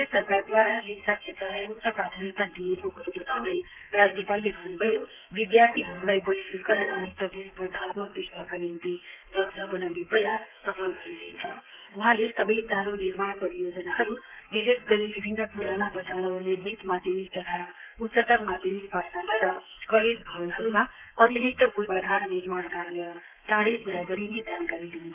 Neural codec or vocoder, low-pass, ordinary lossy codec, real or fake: codec, 32 kHz, 1.9 kbps, SNAC; 3.6 kHz; none; fake